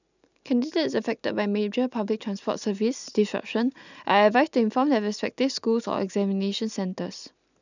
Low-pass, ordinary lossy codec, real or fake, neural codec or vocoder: 7.2 kHz; none; real; none